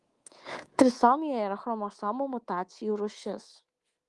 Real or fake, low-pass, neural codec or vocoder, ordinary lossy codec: fake; 10.8 kHz; codec, 24 kHz, 3.1 kbps, DualCodec; Opus, 24 kbps